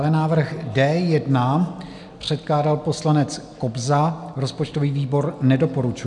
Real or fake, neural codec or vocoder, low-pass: real; none; 10.8 kHz